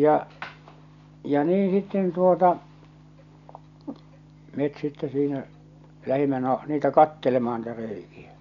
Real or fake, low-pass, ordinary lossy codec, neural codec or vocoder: real; 7.2 kHz; none; none